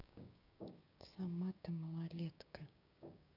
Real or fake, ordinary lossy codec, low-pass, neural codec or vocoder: fake; none; 5.4 kHz; codec, 16 kHz, 6 kbps, DAC